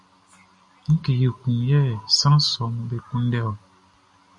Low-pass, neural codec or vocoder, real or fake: 10.8 kHz; none; real